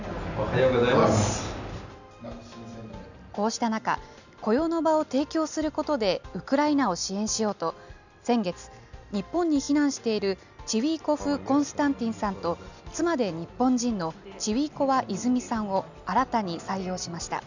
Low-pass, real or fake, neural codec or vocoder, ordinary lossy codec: 7.2 kHz; real; none; none